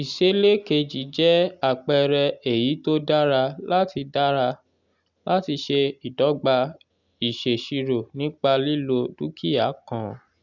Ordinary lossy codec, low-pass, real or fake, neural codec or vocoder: none; 7.2 kHz; real; none